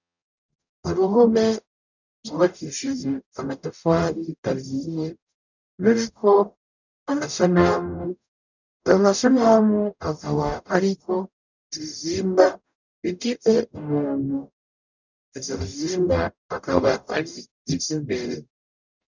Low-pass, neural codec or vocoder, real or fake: 7.2 kHz; codec, 44.1 kHz, 0.9 kbps, DAC; fake